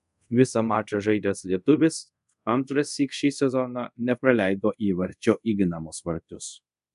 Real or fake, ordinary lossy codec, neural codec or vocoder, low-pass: fake; MP3, 96 kbps; codec, 24 kHz, 0.5 kbps, DualCodec; 10.8 kHz